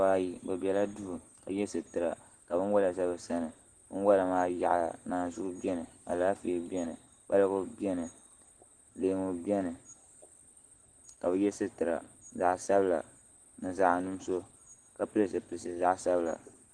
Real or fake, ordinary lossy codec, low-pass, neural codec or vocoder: real; Opus, 16 kbps; 9.9 kHz; none